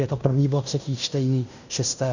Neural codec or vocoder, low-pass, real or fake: codec, 16 kHz in and 24 kHz out, 0.9 kbps, LongCat-Audio-Codec, fine tuned four codebook decoder; 7.2 kHz; fake